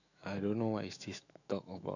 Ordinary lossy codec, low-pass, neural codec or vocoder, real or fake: none; 7.2 kHz; autoencoder, 48 kHz, 128 numbers a frame, DAC-VAE, trained on Japanese speech; fake